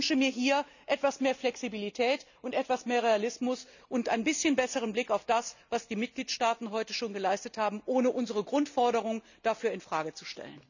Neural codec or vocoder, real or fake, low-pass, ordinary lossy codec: none; real; 7.2 kHz; none